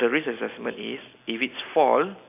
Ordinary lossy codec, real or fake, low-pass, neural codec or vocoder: none; real; 3.6 kHz; none